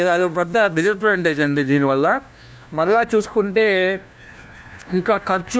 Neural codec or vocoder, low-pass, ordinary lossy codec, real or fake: codec, 16 kHz, 1 kbps, FunCodec, trained on LibriTTS, 50 frames a second; none; none; fake